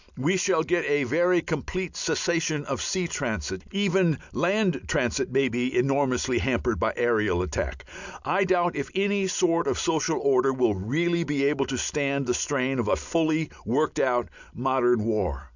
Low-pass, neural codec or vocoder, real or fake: 7.2 kHz; none; real